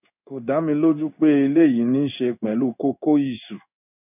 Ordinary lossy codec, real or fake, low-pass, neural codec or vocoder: none; fake; 3.6 kHz; codec, 16 kHz in and 24 kHz out, 1 kbps, XY-Tokenizer